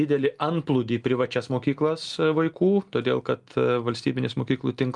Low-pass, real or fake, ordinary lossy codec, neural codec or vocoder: 10.8 kHz; real; Opus, 32 kbps; none